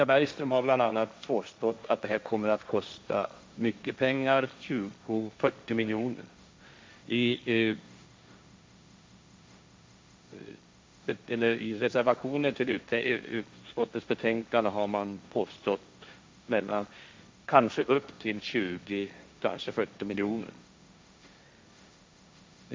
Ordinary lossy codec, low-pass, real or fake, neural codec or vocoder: none; none; fake; codec, 16 kHz, 1.1 kbps, Voila-Tokenizer